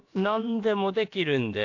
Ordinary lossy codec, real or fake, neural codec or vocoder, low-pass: AAC, 48 kbps; fake; codec, 16 kHz, about 1 kbps, DyCAST, with the encoder's durations; 7.2 kHz